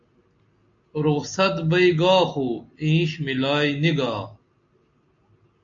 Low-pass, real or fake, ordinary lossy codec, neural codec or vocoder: 7.2 kHz; real; AAC, 64 kbps; none